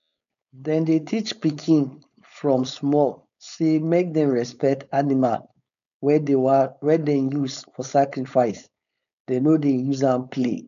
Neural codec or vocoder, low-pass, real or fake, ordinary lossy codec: codec, 16 kHz, 4.8 kbps, FACodec; 7.2 kHz; fake; none